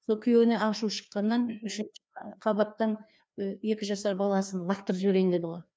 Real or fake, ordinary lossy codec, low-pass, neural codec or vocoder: fake; none; none; codec, 16 kHz, 2 kbps, FreqCodec, larger model